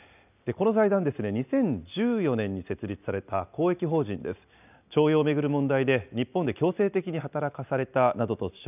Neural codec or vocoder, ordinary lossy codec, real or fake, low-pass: none; none; real; 3.6 kHz